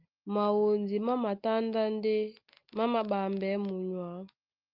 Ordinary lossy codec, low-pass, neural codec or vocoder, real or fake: Opus, 32 kbps; 5.4 kHz; none; real